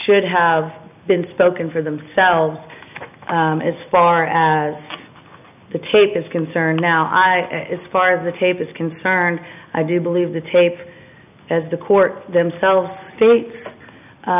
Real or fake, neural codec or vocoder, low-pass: real; none; 3.6 kHz